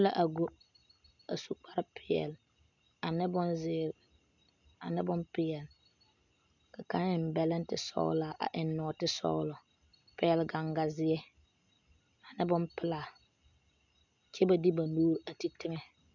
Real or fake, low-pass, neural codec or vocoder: real; 7.2 kHz; none